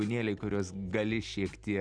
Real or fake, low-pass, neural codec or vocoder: real; 9.9 kHz; none